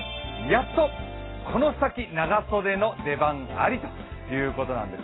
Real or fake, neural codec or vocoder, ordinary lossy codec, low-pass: real; none; AAC, 16 kbps; 7.2 kHz